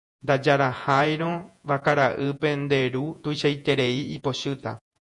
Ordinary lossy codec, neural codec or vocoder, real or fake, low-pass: MP3, 96 kbps; vocoder, 48 kHz, 128 mel bands, Vocos; fake; 10.8 kHz